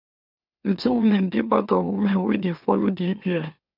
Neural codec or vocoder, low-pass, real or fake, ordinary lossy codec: autoencoder, 44.1 kHz, a latent of 192 numbers a frame, MeloTTS; 5.4 kHz; fake; none